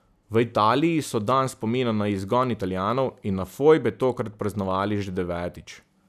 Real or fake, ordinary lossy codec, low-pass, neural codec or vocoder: real; none; 14.4 kHz; none